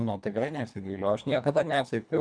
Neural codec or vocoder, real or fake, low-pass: codec, 24 kHz, 1.5 kbps, HILCodec; fake; 9.9 kHz